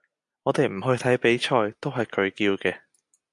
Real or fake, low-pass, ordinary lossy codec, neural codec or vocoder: real; 10.8 kHz; MP3, 64 kbps; none